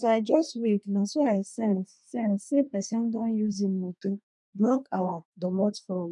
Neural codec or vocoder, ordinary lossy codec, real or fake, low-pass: codec, 24 kHz, 1 kbps, SNAC; none; fake; 10.8 kHz